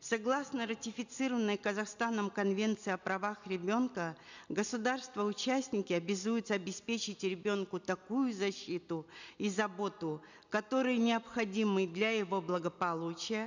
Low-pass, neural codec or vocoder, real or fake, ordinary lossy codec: 7.2 kHz; none; real; none